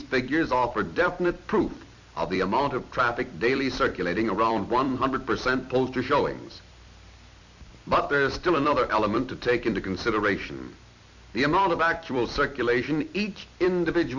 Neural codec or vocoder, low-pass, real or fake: vocoder, 44.1 kHz, 128 mel bands every 256 samples, BigVGAN v2; 7.2 kHz; fake